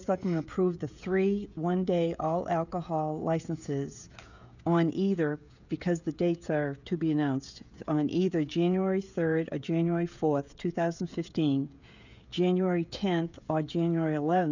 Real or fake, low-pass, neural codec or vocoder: fake; 7.2 kHz; codec, 16 kHz, 16 kbps, FreqCodec, smaller model